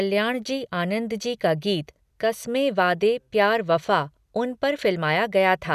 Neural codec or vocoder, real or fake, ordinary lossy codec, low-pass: none; real; none; 14.4 kHz